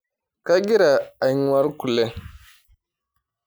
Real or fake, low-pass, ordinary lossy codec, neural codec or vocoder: real; none; none; none